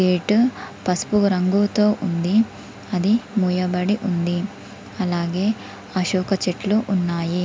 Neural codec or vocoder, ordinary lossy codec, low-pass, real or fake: none; none; none; real